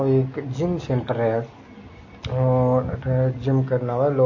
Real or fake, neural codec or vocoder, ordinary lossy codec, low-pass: real; none; MP3, 32 kbps; 7.2 kHz